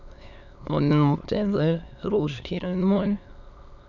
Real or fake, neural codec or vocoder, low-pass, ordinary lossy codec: fake; autoencoder, 22.05 kHz, a latent of 192 numbers a frame, VITS, trained on many speakers; 7.2 kHz; none